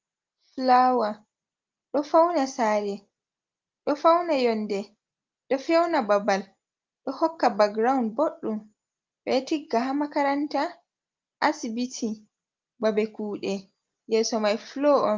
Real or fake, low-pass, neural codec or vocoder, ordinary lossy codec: real; 7.2 kHz; none; Opus, 32 kbps